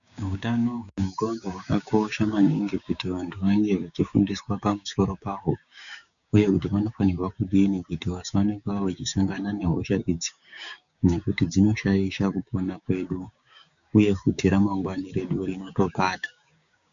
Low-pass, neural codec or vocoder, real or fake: 7.2 kHz; codec, 16 kHz, 6 kbps, DAC; fake